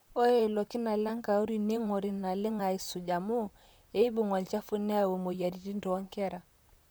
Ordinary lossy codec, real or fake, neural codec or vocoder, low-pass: none; fake; vocoder, 44.1 kHz, 128 mel bands, Pupu-Vocoder; none